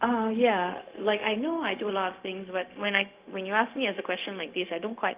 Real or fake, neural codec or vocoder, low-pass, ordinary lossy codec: fake; codec, 16 kHz, 0.4 kbps, LongCat-Audio-Codec; 3.6 kHz; Opus, 32 kbps